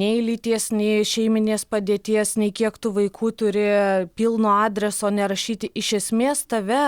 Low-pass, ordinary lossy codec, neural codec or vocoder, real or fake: 19.8 kHz; Opus, 64 kbps; none; real